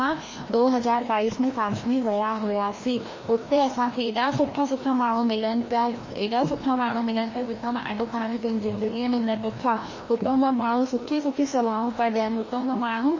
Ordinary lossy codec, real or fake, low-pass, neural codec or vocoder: MP3, 32 kbps; fake; 7.2 kHz; codec, 16 kHz, 1 kbps, FreqCodec, larger model